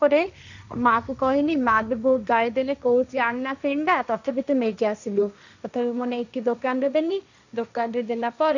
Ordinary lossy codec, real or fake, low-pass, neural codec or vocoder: none; fake; 7.2 kHz; codec, 16 kHz, 1.1 kbps, Voila-Tokenizer